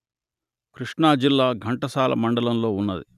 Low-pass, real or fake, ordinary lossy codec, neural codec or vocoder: 14.4 kHz; real; none; none